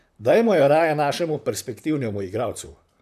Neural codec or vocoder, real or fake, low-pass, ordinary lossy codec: vocoder, 44.1 kHz, 128 mel bands, Pupu-Vocoder; fake; 14.4 kHz; MP3, 96 kbps